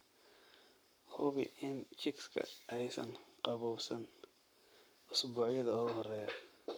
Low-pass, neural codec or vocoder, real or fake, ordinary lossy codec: none; codec, 44.1 kHz, 7.8 kbps, Pupu-Codec; fake; none